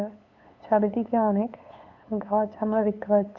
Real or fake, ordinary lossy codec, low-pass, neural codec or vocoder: fake; none; 7.2 kHz; codec, 16 kHz, 8 kbps, FunCodec, trained on LibriTTS, 25 frames a second